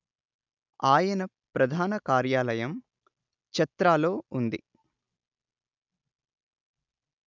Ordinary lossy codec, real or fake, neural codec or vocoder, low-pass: none; real; none; 7.2 kHz